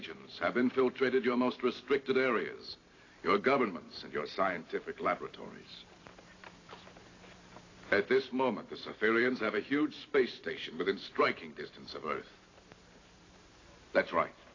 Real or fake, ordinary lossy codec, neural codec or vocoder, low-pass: fake; AAC, 32 kbps; vocoder, 44.1 kHz, 128 mel bands every 512 samples, BigVGAN v2; 7.2 kHz